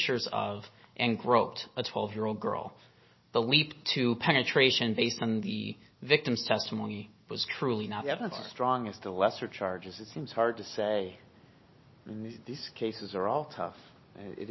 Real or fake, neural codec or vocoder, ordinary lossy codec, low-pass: real; none; MP3, 24 kbps; 7.2 kHz